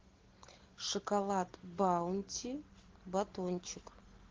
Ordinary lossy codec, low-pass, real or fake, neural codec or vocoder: Opus, 16 kbps; 7.2 kHz; real; none